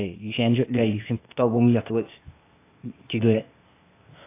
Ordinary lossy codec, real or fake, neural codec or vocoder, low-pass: AAC, 24 kbps; fake; codec, 16 kHz, 0.8 kbps, ZipCodec; 3.6 kHz